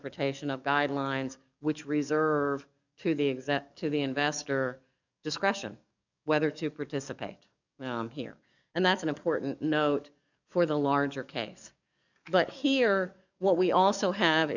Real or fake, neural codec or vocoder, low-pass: fake; codec, 44.1 kHz, 7.8 kbps, DAC; 7.2 kHz